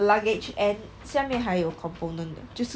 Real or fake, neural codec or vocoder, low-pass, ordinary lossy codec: real; none; none; none